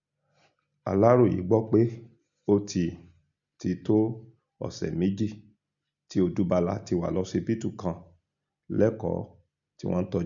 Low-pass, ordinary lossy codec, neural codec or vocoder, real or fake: 7.2 kHz; none; none; real